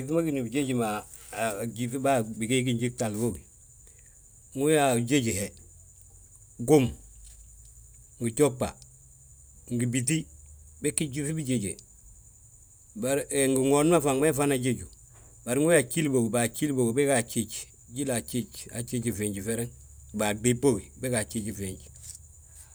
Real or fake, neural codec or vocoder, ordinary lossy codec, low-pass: real; none; none; none